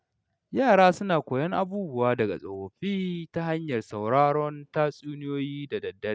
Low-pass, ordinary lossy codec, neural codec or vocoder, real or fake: none; none; none; real